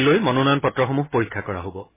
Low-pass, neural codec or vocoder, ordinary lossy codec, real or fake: 3.6 kHz; none; MP3, 16 kbps; real